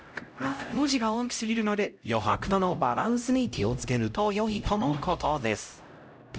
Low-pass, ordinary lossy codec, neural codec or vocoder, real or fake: none; none; codec, 16 kHz, 0.5 kbps, X-Codec, HuBERT features, trained on LibriSpeech; fake